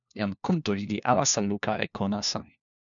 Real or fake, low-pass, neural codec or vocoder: fake; 7.2 kHz; codec, 16 kHz, 1 kbps, FunCodec, trained on LibriTTS, 50 frames a second